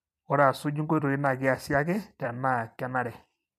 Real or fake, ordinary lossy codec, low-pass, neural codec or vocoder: fake; MP3, 96 kbps; 14.4 kHz; vocoder, 44.1 kHz, 128 mel bands every 256 samples, BigVGAN v2